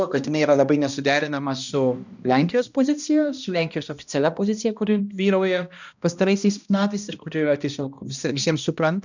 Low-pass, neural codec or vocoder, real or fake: 7.2 kHz; codec, 16 kHz, 1 kbps, X-Codec, HuBERT features, trained on balanced general audio; fake